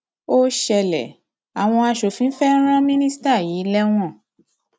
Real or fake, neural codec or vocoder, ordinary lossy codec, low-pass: real; none; none; none